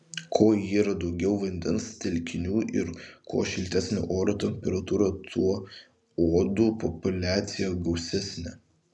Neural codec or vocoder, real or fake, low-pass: none; real; 10.8 kHz